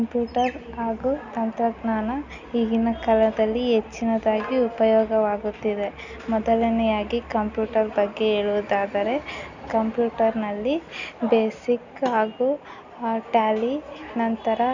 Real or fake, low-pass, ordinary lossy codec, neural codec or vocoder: real; 7.2 kHz; none; none